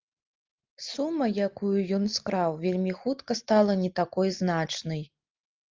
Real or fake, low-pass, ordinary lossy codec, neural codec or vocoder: real; 7.2 kHz; Opus, 32 kbps; none